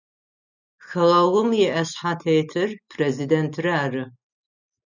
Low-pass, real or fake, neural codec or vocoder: 7.2 kHz; real; none